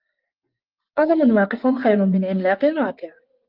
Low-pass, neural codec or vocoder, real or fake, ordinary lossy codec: 5.4 kHz; vocoder, 24 kHz, 100 mel bands, Vocos; fake; Opus, 24 kbps